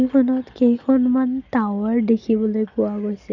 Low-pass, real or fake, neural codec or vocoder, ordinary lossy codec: 7.2 kHz; real; none; none